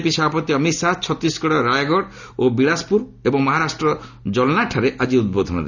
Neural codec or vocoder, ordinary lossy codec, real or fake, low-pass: none; none; real; 7.2 kHz